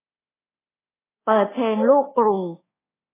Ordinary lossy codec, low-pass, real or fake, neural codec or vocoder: AAC, 16 kbps; 3.6 kHz; fake; codec, 24 kHz, 1.2 kbps, DualCodec